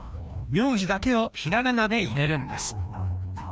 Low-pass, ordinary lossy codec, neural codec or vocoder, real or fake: none; none; codec, 16 kHz, 1 kbps, FreqCodec, larger model; fake